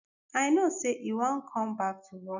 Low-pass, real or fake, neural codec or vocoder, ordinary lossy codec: 7.2 kHz; real; none; none